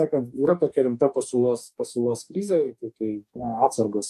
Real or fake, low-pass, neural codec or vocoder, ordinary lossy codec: fake; 14.4 kHz; codec, 44.1 kHz, 2.6 kbps, DAC; AAC, 96 kbps